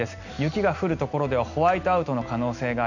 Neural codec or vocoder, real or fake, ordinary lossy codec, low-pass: none; real; none; 7.2 kHz